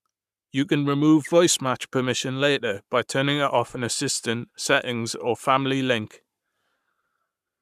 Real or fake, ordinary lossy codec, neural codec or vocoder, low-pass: fake; none; codec, 44.1 kHz, 7.8 kbps, Pupu-Codec; 14.4 kHz